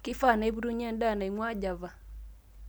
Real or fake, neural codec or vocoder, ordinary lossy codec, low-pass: real; none; none; none